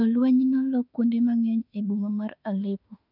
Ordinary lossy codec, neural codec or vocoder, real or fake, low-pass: none; autoencoder, 48 kHz, 32 numbers a frame, DAC-VAE, trained on Japanese speech; fake; 5.4 kHz